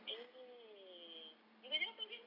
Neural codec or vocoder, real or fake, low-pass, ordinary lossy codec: none; real; 5.4 kHz; none